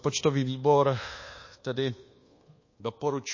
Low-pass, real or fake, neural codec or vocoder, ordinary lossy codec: 7.2 kHz; fake; codec, 24 kHz, 1.2 kbps, DualCodec; MP3, 32 kbps